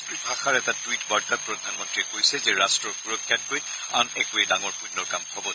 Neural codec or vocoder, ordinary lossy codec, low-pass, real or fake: none; none; none; real